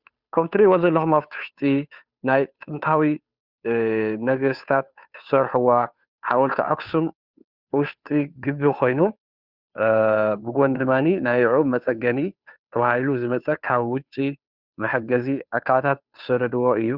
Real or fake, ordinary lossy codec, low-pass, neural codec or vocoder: fake; Opus, 64 kbps; 5.4 kHz; codec, 16 kHz, 2 kbps, FunCodec, trained on Chinese and English, 25 frames a second